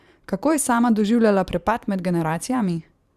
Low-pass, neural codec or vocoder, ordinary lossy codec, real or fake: 14.4 kHz; vocoder, 44.1 kHz, 128 mel bands every 512 samples, BigVGAN v2; Opus, 64 kbps; fake